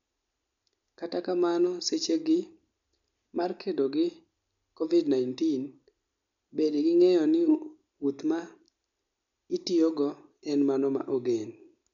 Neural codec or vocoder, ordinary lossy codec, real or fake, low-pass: none; MP3, 64 kbps; real; 7.2 kHz